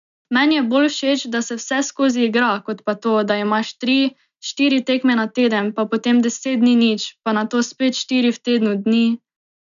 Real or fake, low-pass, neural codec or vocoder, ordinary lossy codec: real; 7.2 kHz; none; none